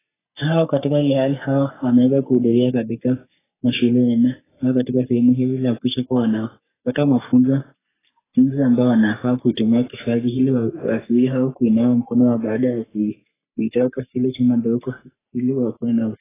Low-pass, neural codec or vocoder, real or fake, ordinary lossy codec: 3.6 kHz; codec, 44.1 kHz, 3.4 kbps, Pupu-Codec; fake; AAC, 16 kbps